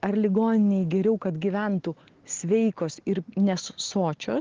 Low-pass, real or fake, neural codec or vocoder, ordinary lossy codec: 7.2 kHz; real; none; Opus, 16 kbps